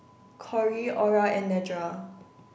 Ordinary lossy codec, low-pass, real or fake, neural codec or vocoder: none; none; real; none